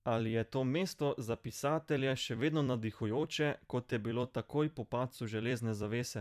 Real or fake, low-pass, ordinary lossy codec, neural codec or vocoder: fake; 14.4 kHz; none; vocoder, 44.1 kHz, 128 mel bands every 256 samples, BigVGAN v2